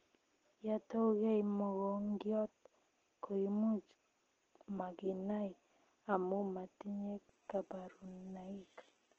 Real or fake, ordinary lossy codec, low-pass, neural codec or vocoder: real; Opus, 16 kbps; 7.2 kHz; none